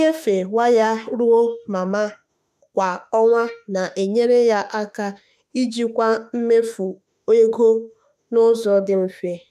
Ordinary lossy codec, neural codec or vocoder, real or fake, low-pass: none; autoencoder, 48 kHz, 32 numbers a frame, DAC-VAE, trained on Japanese speech; fake; 14.4 kHz